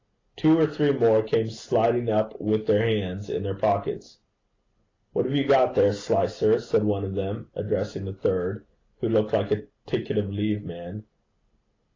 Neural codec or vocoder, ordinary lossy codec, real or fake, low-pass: none; AAC, 32 kbps; real; 7.2 kHz